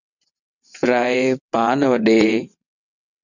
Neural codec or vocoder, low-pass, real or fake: vocoder, 22.05 kHz, 80 mel bands, WaveNeXt; 7.2 kHz; fake